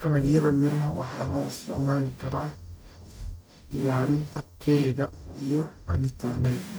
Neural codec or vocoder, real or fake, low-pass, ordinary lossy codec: codec, 44.1 kHz, 0.9 kbps, DAC; fake; none; none